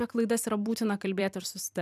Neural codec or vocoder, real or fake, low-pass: vocoder, 44.1 kHz, 128 mel bands every 256 samples, BigVGAN v2; fake; 14.4 kHz